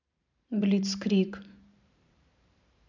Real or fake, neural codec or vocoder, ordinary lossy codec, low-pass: fake; vocoder, 44.1 kHz, 128 mel bands every 512 samples, BigVGAN v2; none; 7.2 kHz